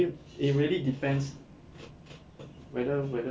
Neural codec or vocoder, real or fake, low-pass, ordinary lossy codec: none; real; none; none